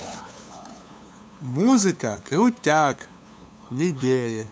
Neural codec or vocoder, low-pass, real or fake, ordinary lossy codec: codec, 16 kHz, 2 kbps, FunCodec, trained on LibriTTS, 25 frames a second; none; fake; none